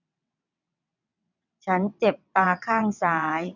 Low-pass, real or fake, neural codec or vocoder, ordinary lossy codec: 7.2 kHz; fake; vocoder, 22.05 kHz, 80 mel bands, WaveNeXt; none